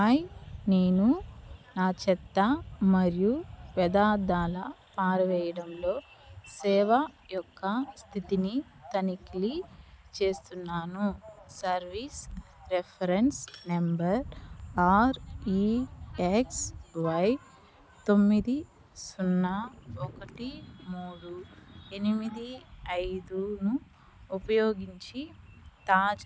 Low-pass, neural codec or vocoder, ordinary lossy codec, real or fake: none; none; none; real